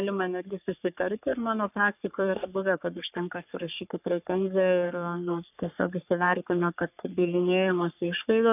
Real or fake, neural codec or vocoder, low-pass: fake; codec, 44.1 kHz, 3.4 kbps, Pupu-Codec; 3.6 kHz